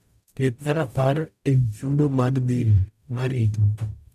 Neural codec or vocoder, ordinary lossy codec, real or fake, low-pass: codec, 44.1 kHz, 0.9 kbps, DAC; none; fake; 14.4 kHz